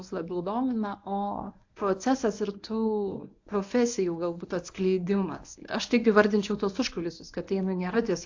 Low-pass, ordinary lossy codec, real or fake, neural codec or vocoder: 7.2 kHz; AAC, 48 kbps; fake; codec, 24 kHz, 0.9 kbps, WavTokenizer, medium speech release version 1